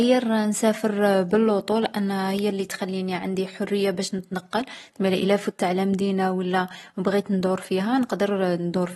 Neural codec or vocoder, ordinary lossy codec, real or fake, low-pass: none; AAC, 32 kbps; real; 19.8 kHz